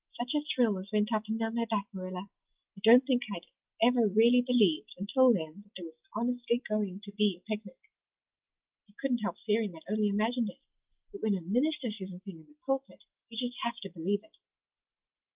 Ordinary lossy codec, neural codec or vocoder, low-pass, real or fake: Opus, 32 kbps; none; 3.6 kHz; real